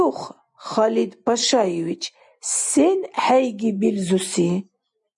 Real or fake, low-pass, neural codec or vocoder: real; 10.8 kHz; none